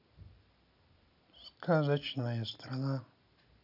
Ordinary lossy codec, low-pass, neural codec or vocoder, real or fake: none; 5.4 kHz; none; real